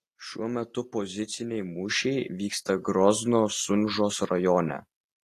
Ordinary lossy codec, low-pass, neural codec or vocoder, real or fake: AAC, 48 kbps; 14.4 kHz; none; real